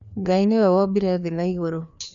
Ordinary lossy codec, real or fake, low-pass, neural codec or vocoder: none; fake; 7.2 kHz; codec, 16 kHz, 2 kbps, FreqCodec, larger model